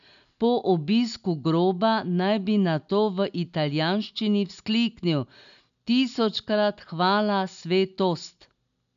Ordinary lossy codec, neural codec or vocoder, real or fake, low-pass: none; none; real; 7.2 kHz